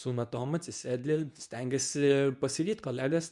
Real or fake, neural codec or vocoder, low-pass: fake; codec, 24 kHz, 0.9 kbps, WavTokenizer, medium speech release version 2; 10.8 kHz